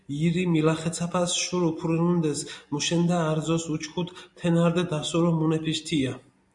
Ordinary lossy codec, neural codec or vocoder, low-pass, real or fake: MP3, 64 kbps; none; 10.8 kHz; real